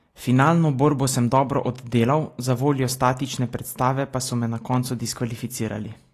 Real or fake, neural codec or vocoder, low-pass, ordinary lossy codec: real; none; 14.4 kHz; AAC, 48 kbps